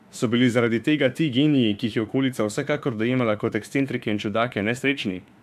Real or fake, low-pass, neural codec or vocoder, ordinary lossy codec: fake; 14.4 kHz; autoencoder, 48 kHz, 32 numbers a frame, DAC-VAE, trained on Japanese speech; AAC, 96 kbps